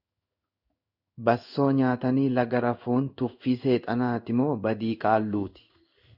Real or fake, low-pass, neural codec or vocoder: fake; 5.4 kHz; codec, 16 kHz in and 24 kHz out, 1 kbps, XY-Tokenizer